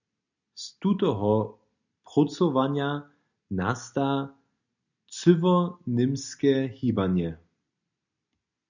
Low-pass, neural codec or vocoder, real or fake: 7.2 kHz; none; real